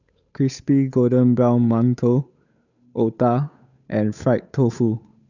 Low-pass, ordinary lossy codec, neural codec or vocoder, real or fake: 7.2 kHz; none; codec, 16 kHz, 8 kbps, FunCodec, trained on Chinese and English, 25 frames a second; fake